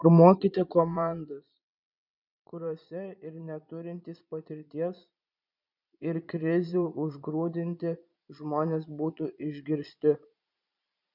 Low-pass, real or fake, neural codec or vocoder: 5.4 kHz; fake; vocoder, 22.05 kHz, 80 mel bands, Vocos